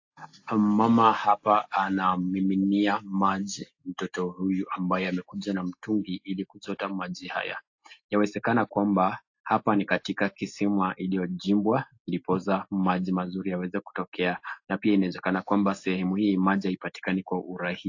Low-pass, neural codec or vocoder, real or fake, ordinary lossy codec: 7.2 kHz; none; real; AAC, 48 kbps